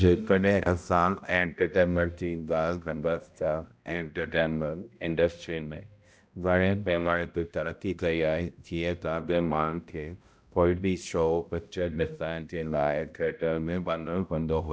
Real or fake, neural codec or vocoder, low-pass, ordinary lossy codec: fake; codec, 16 kHz, 0.5 kbps, X-Codec, HuBERT features, trained on balanced general audio; none; none